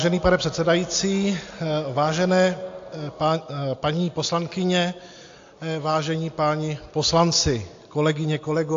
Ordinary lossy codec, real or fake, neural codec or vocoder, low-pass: AAC, 48 kbps; real; none; 7.2 kHz